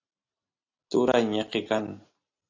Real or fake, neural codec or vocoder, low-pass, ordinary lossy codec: real; none; 7.2 kHz; AAC, 32 kbps